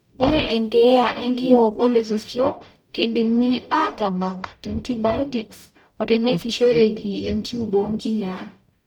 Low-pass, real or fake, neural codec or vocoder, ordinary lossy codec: 19.8 kHz; fake; codec, 44.1 kHz, 0.9 kbps, DAC; none